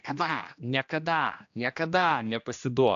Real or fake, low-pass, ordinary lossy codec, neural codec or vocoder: fake; 7.2 kHz; AAC, 64 kbps; codec, 16 kHz, 1 kbps, X-Codec, HuBERT features, trained on general audio